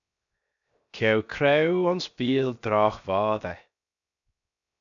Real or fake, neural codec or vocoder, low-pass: fake; codec, 16 kHz, 0.7 kbps, FocalCodec; 7.2 kHz